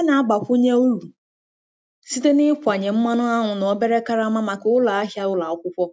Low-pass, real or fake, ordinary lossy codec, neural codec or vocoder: none; real; none; none